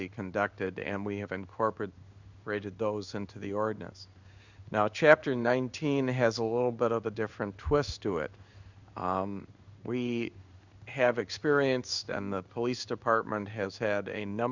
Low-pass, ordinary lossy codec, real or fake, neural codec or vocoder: 7.2 kHz; Opus, 64 kbps; fake; codec, 16 kHz in and 24 kHz out, 1 kbps, XY-Tokenizer